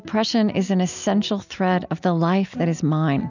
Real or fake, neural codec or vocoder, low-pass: real; none; 7.2 kHz